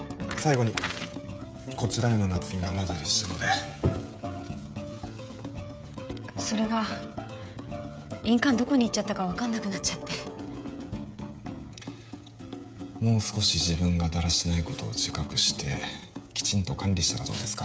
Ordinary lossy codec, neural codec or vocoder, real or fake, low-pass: none; codec, 16 kHz, 16 kbps, FreqCodec, smaller model; fake; none